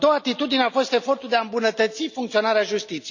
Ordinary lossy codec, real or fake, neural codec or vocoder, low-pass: none; real; none; 7.2 kHz